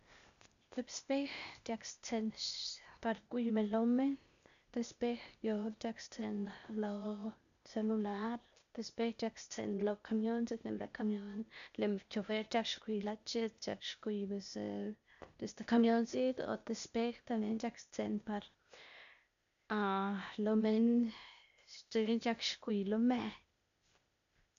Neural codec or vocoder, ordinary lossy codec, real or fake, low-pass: codec, 16 kHz, 0.8 kbps, ZipCodec; none; fake; 7.2 kHz